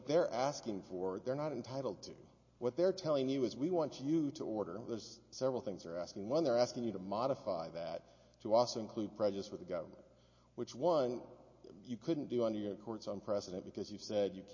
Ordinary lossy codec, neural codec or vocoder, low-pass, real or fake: MP3, 32 kbps; none; 7.2 kHz; real